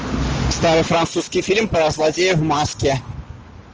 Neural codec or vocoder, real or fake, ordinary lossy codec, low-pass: none; real; Opus, 24 kbps; 7.2 kHz